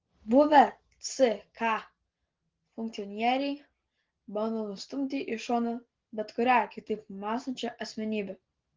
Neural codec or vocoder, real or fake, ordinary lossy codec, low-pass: none; real; Opus, 16 kbps; 7.2 kHz